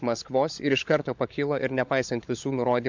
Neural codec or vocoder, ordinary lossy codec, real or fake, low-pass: codec, 16 kHz, 16 kbps, FunCodec, trained on LibriTTS, 50 frames a second; MP3, 64 kbps; fake; 7.2 kHz